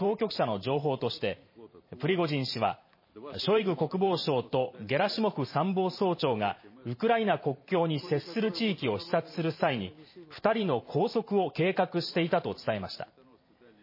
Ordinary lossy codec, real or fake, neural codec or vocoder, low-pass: MP3, 24 kbps; fake; vocoder, 44.1 kHz, 128 mel bands every 512 samples, BigVGAN v2; 5.4 kHz